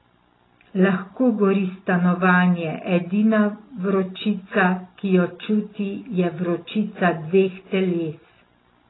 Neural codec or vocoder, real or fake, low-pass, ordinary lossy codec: none; real; 7.2 kHz; AAC, 16 kbps